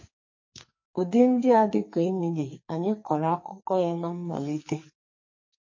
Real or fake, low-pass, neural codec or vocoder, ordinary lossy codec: fake; 7.2 kHz; codec, 44.1 kHz, 2.6 kbps, SNAC; MP3, 32 kbps